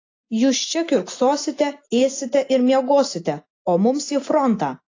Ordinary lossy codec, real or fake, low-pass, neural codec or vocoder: AAC, 32 kbps; real; 7.2 kHz; none